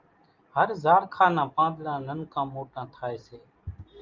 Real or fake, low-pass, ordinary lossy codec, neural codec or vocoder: real; 7.2 kHz; Opus, 24 kbps; none